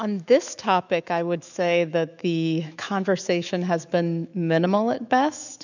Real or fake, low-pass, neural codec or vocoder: fake; 7.2 kHz; autoencoder, 48 kHz, 128 numbers a frame, DAC-VAE, trained on Japanese speech